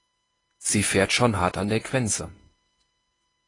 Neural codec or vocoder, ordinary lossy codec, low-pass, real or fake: codec, 24 kHz, 0.9 kbps, WavTokenizer, medium speech release version 1; AAC, 32 kbps; 10.8 kHz; fake